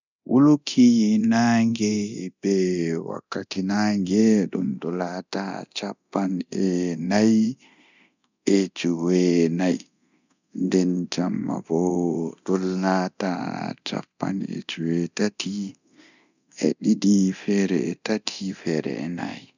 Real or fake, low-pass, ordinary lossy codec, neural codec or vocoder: fake; 7.2 kHz; none; codec, 24 kHz, 0.9 kbps, DualCodec